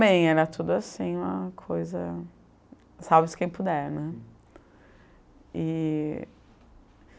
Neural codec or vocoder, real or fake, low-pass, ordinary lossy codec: none; real; none; none